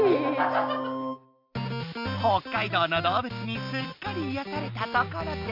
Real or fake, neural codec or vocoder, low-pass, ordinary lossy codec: real; none; 5.4 kHz; MP3, 48 kbps